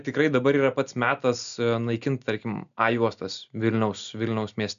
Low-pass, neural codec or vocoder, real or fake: 7.2 kHz; none; real